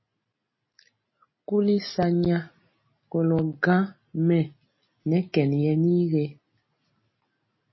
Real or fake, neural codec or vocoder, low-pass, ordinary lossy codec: real; none; 7.2 kHz; MP3, 24 kbps